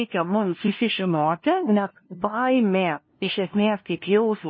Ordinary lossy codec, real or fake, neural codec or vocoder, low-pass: MP3, 24 kbps; fake; codec, 16 kHz, 0.5 kbps, FunCodec, trained on LibriTTS, 25 frames a second; 7.2 kHz